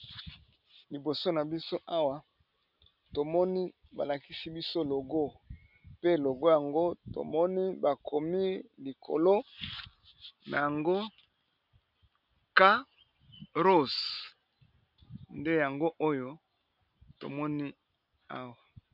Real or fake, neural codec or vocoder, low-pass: real; none; 5.4 kHz